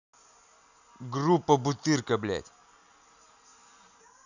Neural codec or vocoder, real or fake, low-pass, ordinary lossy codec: none; real; 7.2 kHz; none